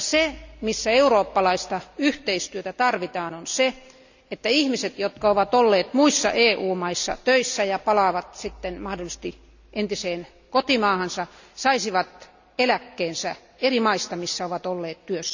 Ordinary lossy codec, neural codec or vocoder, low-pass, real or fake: none; none; 7.2 kHz; real